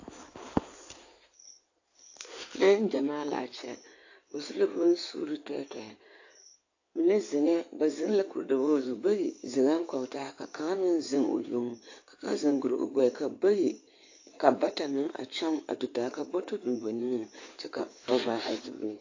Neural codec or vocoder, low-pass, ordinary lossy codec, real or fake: codec, 16 kHz in and 24 kHz out, 2.2 kbps, FireRedTTS-2 codec; 7.2 kHz; AAC, 48 kbps; fake